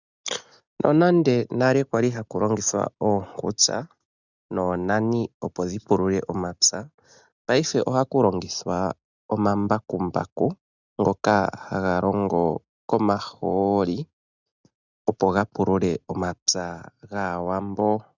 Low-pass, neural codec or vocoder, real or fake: 7.2 kHz; none; real